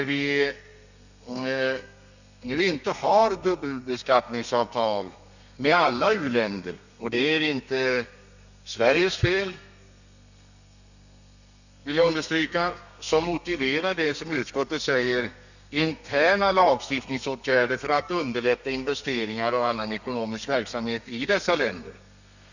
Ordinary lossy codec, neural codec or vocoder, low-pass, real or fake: none; codec, 32 kHz, 1.9 kbps, SNAC; 7.2 kHz; fake